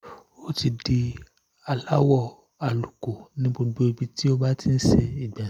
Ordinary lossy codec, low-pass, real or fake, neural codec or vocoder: none; 19.8 kHz; real; none